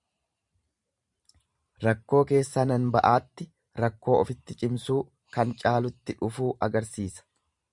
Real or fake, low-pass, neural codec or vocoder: fake; 10.8 kHz; vocoder, 44.1 kHz, 128 mel bands every 512 samples, BigVGAN v2